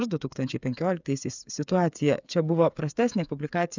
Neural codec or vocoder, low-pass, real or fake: codec, 16 kHz, 8 kbps, FreqCodec, smaller model; 7.2 kHz; fake